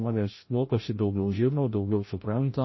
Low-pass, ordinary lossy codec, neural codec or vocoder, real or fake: 7.2 kHz; MP3, 24 kbps; codec, 16 kHz, 0.5 kbps, FreqCodec, larger model; fake